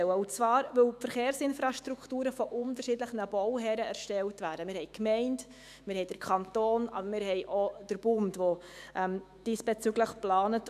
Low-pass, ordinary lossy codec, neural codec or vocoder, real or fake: 14.4 kHz; none; autoencoder, 48 kHz, 128 numbers a frame, DAC-VAE, trained on Japanese speech; fake